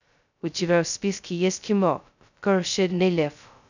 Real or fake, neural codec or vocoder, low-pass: fake; codec, 16 kHz, 0.2 kbps, FocalCodec; 7.2 kHz